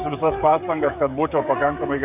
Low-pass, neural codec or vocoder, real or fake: 3.6 kHz; codec, 16 kHz, 16 kbps, FreqCodec, smaller model; fake